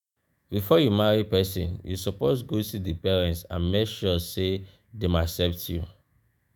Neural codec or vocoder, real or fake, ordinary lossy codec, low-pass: autoencoder, 48 kHz, 128 numbers a frame, DAC-VAE, trained on Japanese speech; fake; none; none